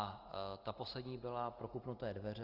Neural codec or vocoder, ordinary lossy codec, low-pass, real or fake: none; Opus, 24 kbps; 5.4 kHz; real